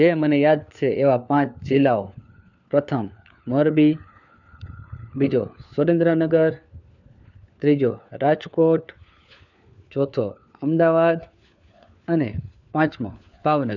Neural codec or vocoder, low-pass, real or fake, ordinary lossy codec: codec, 16 kHz, 4 kbps, FunCodec, trained on LibriTTS, 50 frames a second; 7.2 kHz; fake; none